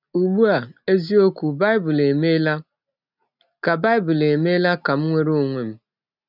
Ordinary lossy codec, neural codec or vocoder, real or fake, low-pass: none; none; real; 5.4 kHz